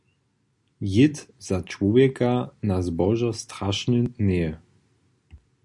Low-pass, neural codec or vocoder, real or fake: 10.8 kHz; none; real